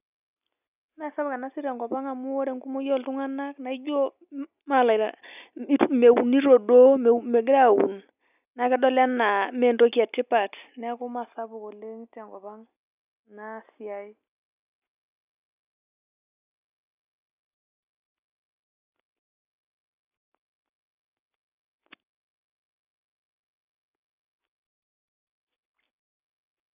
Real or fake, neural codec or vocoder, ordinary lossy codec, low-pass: real; none; none; 3.6 kHz